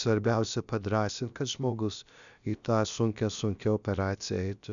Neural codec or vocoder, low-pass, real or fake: codec, 16 kHz, about 1 kbps, DyCAST, with the encoder's durations; 7.2 kHz; fake